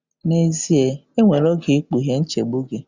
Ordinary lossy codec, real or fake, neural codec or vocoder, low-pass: Opus, 64 kbps; real; none; 7.2 kHz